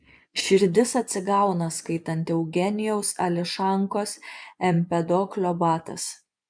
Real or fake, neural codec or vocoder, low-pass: fake; vocoder, 24 kHz, 100 mel bands, Vocos; 9.9 kHz